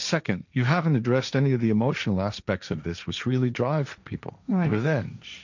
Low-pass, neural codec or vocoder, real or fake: 7.2 kHz; codec, 16 kHz, 1.1 kbps, Voila-Tokenizer; fake